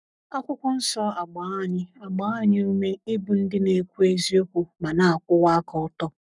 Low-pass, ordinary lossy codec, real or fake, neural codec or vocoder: 10.8 kHz; none; fake; codec, 44.1 kHz, 7.8 kbps, Pupu-Codec